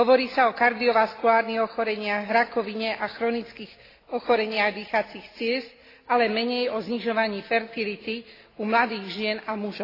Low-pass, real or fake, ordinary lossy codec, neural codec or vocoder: 5.4 kHz; real; AAC, 24 kbps; none